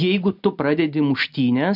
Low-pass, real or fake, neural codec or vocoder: 5.4 kHz; real; none